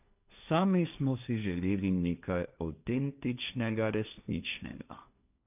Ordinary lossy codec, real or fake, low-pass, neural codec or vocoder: none; fake; 3.6 kHz; codec, 16 kHz, 1.1 kbps, Voila-Tokenizer